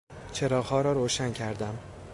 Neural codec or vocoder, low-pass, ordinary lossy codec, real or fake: none; 10.8 kHz; AAC, 64 kbps; real